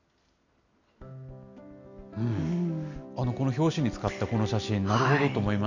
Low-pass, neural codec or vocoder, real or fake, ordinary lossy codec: 7.2 kHz; none; real; none